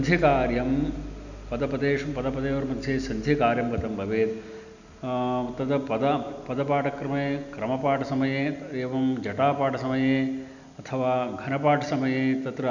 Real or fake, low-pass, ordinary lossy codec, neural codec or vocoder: real; 7.2 kHz; none; none